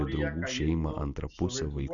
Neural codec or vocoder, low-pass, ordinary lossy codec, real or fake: none; 7.2 kHz; Opus, 64 kbps; real